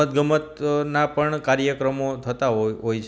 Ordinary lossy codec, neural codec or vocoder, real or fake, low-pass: none; none; real; none